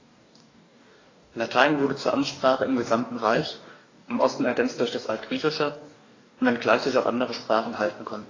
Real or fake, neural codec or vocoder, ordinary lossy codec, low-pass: fake; codec, 44.1 kHz, 2.6 kbps, DAC; AAC, 32 kbps; 7.2 kHz